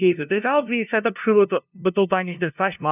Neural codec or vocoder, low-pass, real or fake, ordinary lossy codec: codec, 16 kHz, 0.5 kbps, FunCodec, trained on LibriTTS, 25 frames a second; 3.6 kHz; fake; none